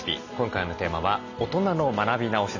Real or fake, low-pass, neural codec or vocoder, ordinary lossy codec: real; 7.2 kHz; none; none